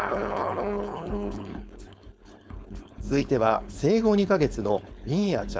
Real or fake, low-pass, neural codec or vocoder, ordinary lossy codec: fake; none; codec, 16 kHz, 4.8 kbps, FACodec; none